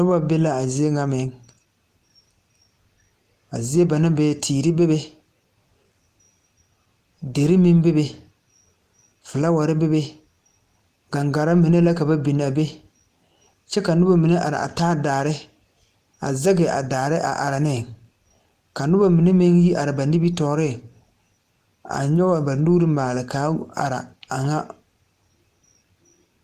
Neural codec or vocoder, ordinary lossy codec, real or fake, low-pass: none; Opus, 24 kbps; real; 14.4 kHz